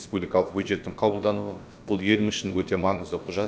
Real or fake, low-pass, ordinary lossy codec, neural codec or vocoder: fake; none; none; codec, 16 kHz, about 1 kbps, DyCAST, with the encoder's durations